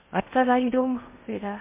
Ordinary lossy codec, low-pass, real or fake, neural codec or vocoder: MP3, 24 kbps; 3.6 kHz; fake; codec, 16 kHz in and 24 kHz out, 0.6 kbps, FocalCodec, streaming, 2048 codes